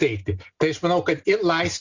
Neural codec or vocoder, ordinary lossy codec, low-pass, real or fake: none; Opus, 64 kbps; 7.2 kHz; real